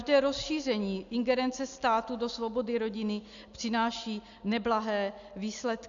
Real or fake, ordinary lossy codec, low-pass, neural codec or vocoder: real; Opus, 64 kbps; 7.2 kHz; none